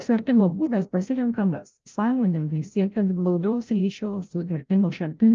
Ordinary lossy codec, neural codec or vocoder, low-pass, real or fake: Opus, 24 kbps; codec, 16 kHz, 0.5 kbps, FreqCodec, larger model; 7.2 kHz; fake